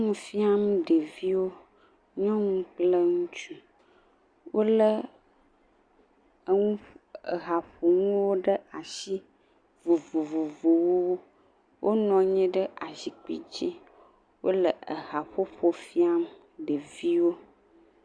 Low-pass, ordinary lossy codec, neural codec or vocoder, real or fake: 9.9 kHz; Opus, 64 kbps; none; real